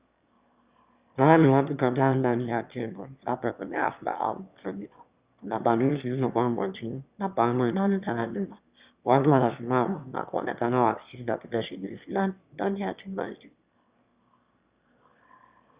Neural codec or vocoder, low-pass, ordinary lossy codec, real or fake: autoencoder, 22.05 kHz, a latent of 192 numbers a frame, VITS, trained on one speaker; 3.6 kHz; Opus, 64 kbps; fake